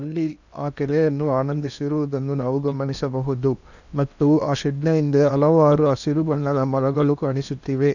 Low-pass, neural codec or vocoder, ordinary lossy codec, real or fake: 7.2 kHz; codec, 16 kHz in and 24 kHz out, 0.8 kbps, FocalCodec, streaming, 65536 codes; none; fake